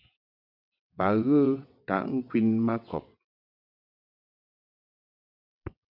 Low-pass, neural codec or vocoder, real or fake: 5.4 kHz; vocoder, 22.05 kHz, 80 mel bands, WaveNeXt; fake